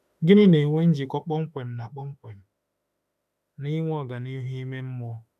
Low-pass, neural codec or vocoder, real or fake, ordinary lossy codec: 14.4 kHz; autoencoder, 48 kHz, 32 numbers a frame, DAC-VAE, trained on Japanese speech; fake; none